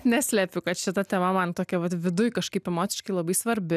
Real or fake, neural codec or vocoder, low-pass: real; none; 14.4 kHz